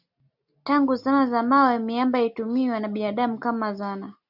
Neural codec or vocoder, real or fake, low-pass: none; real; 5.4 kHz